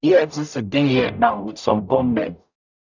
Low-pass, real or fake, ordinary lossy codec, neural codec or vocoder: 7.2 kHz; fake; none; codec, 44.1 kHz, 0.9 kbps, DAC